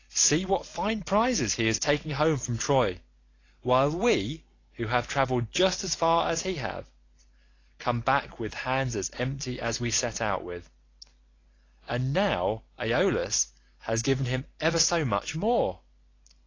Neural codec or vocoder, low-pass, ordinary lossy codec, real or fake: none; 7.2 kHz; AAC, 32 kbps; real